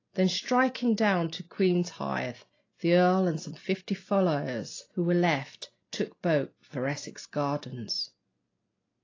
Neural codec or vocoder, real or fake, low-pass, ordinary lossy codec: none; real; 7.2 kHz; AAC, 32 kbps